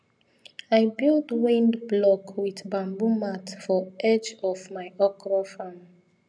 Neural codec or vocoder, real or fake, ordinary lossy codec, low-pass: none; real; none; 9.9 kHz